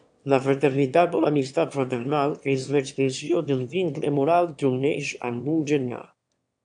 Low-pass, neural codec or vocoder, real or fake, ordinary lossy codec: 9.9 kHz; autoencoder, 22.05 kHz, a latent of 192 numbers a frame, VITS, trained on one speaker; fake; AAC, 64 kbps